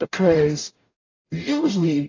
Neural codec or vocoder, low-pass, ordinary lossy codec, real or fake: codec, 44.1 kHz, 0.9 kbps, DAC; 7.2 kHz; AAC, 32 kbps; fake